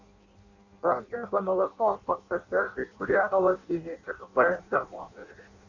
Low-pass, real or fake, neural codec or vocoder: 7.2 kHz; fake; codec, 16 kHz in and 24 kHz out, 0.6 kbps, FireRedTTS-2 codec